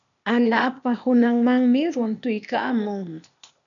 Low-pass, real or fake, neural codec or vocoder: 7.2 kHz; fake; codec, 16 kHz, 0.8 kbps, ZipCodec